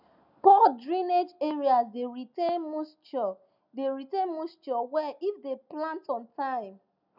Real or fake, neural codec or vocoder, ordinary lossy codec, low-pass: real; none; none; 5.4 kHz